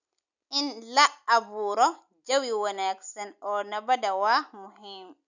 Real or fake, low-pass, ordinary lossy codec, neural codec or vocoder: real; 7.2 kHz; none; none